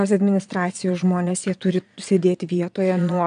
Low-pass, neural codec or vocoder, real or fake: 9.9 kHz; vocoder, 22.05 kHz, 80 mel bands, Vocos; fake